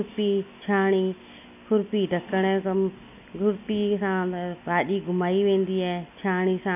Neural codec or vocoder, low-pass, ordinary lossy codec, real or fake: none; 3.6 kHz; none; real